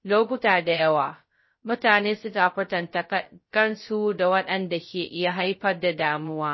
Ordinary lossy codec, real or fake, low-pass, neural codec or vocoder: MP3, 24 kbps; fake; 7.2 kHz; codec, 16 kHz, 0.2 kbps, FocalCodec